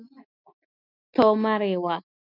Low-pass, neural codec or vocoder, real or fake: 5.4 kHz; none; real